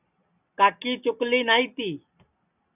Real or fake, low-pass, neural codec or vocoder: real; 3.6 kHz; none